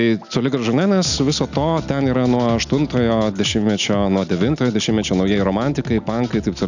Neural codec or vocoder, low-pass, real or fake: none; 7.2 kHz; real